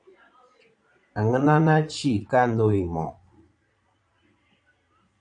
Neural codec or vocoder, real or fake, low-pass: vocoder, 22.05 kHz, 80 mel bands, Vocos; fake; 9.9 kHz